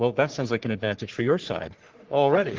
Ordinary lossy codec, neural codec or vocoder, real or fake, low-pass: Opus, 16 kbps; codec, 44.1 kHz, 3.4 kbps, Pupu-Codec; fake; 7.2 kHz